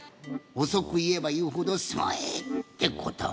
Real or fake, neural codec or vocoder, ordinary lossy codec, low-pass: real; none; none; none